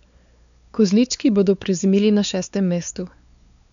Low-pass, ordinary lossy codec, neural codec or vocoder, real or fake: 7.2 kHz; none; codec, 16 kHz, 4 kbps, X-Codec, WavLM features, trained on Multilingual LibriSpeech; fake